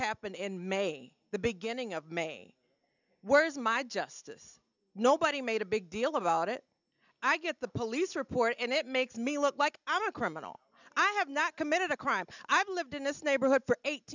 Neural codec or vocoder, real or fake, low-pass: none; real; 7.2 kHz